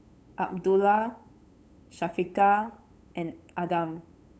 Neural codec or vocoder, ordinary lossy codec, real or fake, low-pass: codec, 16 kHz, 8 kbps, FunCodec, trained on LibriTTS, 25 frames a second; none; fake; none